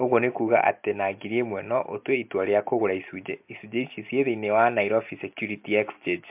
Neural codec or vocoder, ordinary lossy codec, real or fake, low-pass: none; MP3, 32 kbps; real; 3.6 kHz